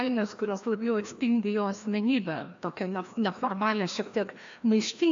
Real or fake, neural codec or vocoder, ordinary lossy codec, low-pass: fake; codec, 16 kHz, 1 kbps, FreqCodec, larger model; AAC, 64 kbps; 7.2 kHz